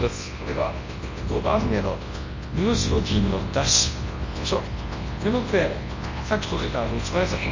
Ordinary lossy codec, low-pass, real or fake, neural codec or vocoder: MP3, 32 kbps; 7.2 kHz; fake; codec, 24 kHz, 0.9 kbps, WavTokenizer, large speech release